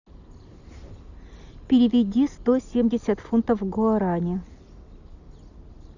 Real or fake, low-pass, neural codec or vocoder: real; 7.2 kHz; none